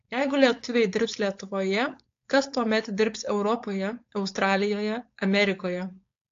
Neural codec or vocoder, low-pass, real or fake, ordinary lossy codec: codec, 16 kHz, 4.8 kbps, FACodec; 7.2 kHz; fake; AAC, 48 kbps